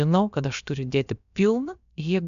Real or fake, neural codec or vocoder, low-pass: fake; codec, 16 kHz, about 1 kbps, DyCAST, with the encoder's durations; 7.2 kHz